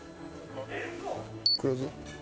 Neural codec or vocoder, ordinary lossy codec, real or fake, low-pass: none; none; real; none